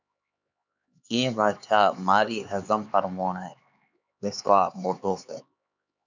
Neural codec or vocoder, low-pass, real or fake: codec, 16 kHz, 4 kbps, X-Codec, HuBERT features, trained on LibriSpeech; 7.2 kHz; fake